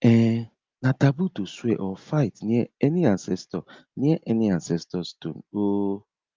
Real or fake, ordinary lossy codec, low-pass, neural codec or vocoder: real; Opus, 24 kbps; 7.2 kHz; none